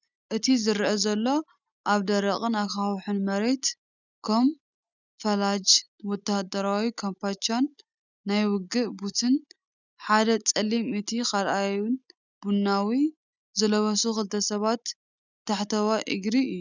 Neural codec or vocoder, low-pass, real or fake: none; 7.2 kHz; real